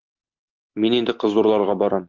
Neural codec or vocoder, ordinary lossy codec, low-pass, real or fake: none; Opus, 32 kbps; 7.2 kHz; real